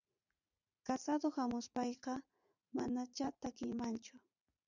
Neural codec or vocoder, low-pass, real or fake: vocoder, 44.1 kHz, 80 mel bands, Vocos; 7.2 kHz; fake